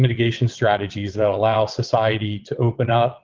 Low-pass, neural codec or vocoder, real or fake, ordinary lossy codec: 7.2 kHz; vocoder, 22.05 kHz, 80 mel bands, WaveNeXt; fake; Opus, 32 kbps